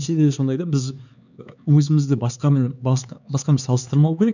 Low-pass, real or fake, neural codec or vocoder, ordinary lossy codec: 7.2 kHz; fake; codec, 16 kHz, 4 kbps, X-Codec, HuBERT features, trained on LibriSpeech; none